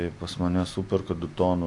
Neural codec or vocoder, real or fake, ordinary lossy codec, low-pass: none; real; AAC, 64 kbps; 10.8 kHz